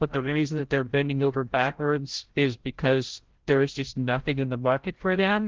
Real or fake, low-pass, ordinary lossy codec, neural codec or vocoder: fake; 7.2 kHz; Opus, 16 kbps; codec, 16 kHz, 0.5 kbps, FreqCodec, larger model